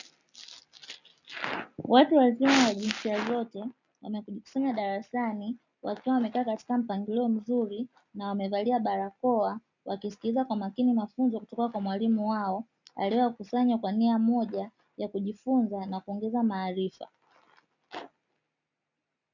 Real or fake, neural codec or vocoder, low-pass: real; none; 7.2 kHz